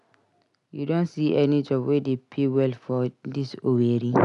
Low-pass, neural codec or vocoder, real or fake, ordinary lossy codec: 10.8 kHz; none; real; none